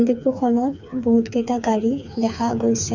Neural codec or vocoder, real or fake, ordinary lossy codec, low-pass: codec, 16 kHz, 4 kbps, FreqCodec, smaller model; fake; none; 7.2 kHz